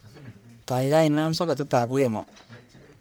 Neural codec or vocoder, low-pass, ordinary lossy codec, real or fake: codec, 44.1 kHz, 1.7 kbps, Pupu-Codec; none; none; fake